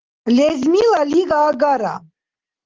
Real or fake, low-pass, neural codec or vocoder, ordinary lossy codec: real; 7.2 kHz; none; Opus, 16 kbps